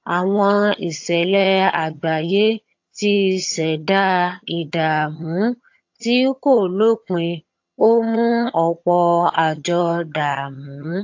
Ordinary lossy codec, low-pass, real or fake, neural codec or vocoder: AAC, 48 kbps; 7.2 kHz; fake; vocoder, 22.05 kHz, 80 mel bands, HiFi-GAN